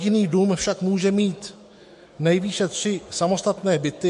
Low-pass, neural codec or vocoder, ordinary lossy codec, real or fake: 14.4 kHz; autoencoder, 48 kHz, 128 numbers a frame, DAC-VAE, trained on Japanese speech; MP3, 48 kbps; fake